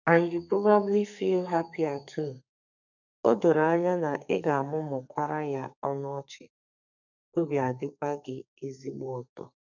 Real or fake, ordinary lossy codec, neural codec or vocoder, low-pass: fake; none; codec, 32 kHz, 1.9 kbps, SNAC; 7.2 kHz